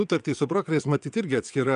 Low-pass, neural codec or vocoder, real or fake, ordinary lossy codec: 9.9 kHz; vocoder, 22.05 kHz, 80 mel bands, WaveNeXt; fake; AAC, 96 kbps